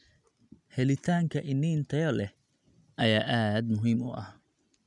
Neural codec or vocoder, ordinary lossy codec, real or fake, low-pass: none; none; real; 10.8 kHz